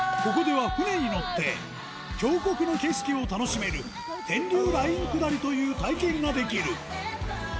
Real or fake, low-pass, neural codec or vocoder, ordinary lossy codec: real; none; none; none